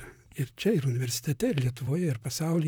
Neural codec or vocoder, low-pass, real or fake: vocoder, 44.1 kHz, 128 mel bands, Pupu-Vocoder; 19.8 kHz; fake